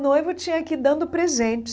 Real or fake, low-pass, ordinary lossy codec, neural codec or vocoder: real; none; none; none